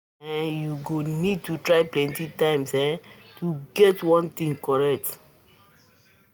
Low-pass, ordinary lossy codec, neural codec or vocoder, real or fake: none; none; none; real